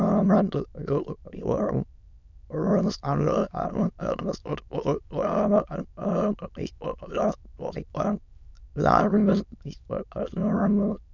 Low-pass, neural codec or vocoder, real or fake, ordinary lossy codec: 7.2 kHz; autoencoder, 22.05 kHz, a latent of 192 numbers a frame, VITS, trained on many speakers; fake; none